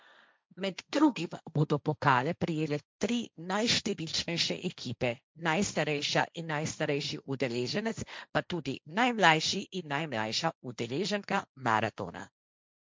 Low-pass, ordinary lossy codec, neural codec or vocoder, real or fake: none; none; codec, 16 kHz, 1.1 kbps, Voila-Tokenizer; fake